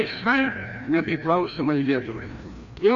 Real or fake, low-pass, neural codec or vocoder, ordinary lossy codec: fake; 7.2 kHz; codec, 16 kHz, 1 kbps, FreqCodec, larger model; AAC, 64 kbps